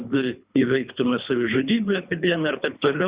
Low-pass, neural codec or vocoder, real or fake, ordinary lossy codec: 3.6 kHz; codec, 24 kHz, 3 kbps, HILCodec; fake; Opus, 64 kbps